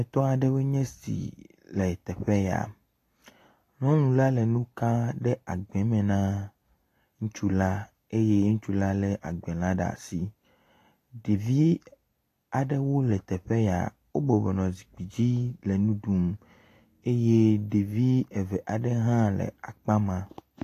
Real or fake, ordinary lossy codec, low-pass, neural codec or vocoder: real; AAC, 48 kbps; 14.4 kHz; none